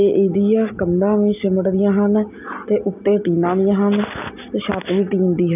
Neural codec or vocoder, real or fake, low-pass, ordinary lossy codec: none; real; 3.6 kHz; none